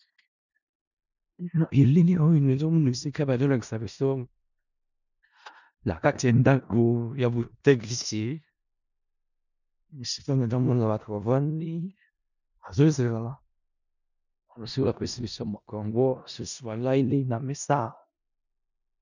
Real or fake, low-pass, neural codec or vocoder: fake; 7.2 kHz; codec, 16 kHz in and 24 kHz out, 0.4 kbps, LongCat-Audio-Codec, four codebook decoder